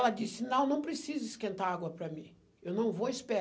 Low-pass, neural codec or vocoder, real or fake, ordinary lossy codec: none; none; real; none